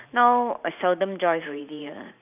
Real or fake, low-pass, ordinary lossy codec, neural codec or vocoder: fake; 3.6 kHz; none; vocoder, 44.1 kHz, 128 mel bands every 512 samples, BigVGAN v2